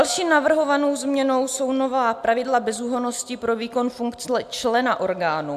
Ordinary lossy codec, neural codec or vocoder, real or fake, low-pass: AAC, 96 kbps; none; real; 14.4 kHz